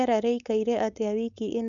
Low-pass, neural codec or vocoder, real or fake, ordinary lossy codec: 7.2 kHz; codec, 16 kHz, 4.8 kbps, FACodec; fake; none